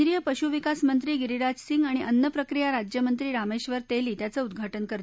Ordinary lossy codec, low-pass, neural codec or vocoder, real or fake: none; none; none; real